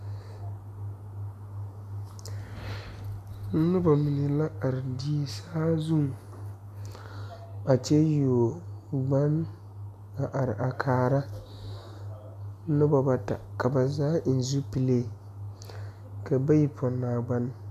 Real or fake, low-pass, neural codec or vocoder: real; 14.4 kHz; none